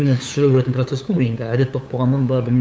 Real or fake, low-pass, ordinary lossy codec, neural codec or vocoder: fake; none; none; codec, 16 kHz, 8 kbps, FunCodec, trained on LibriTTS, 25 frames a second